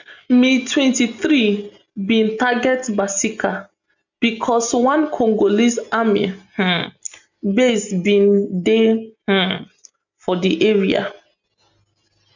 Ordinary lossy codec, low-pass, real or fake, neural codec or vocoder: none; 7.2 kHz; real; none